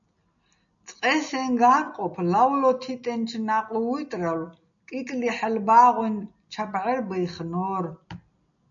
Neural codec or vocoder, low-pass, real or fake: none; 7.2 kHz; real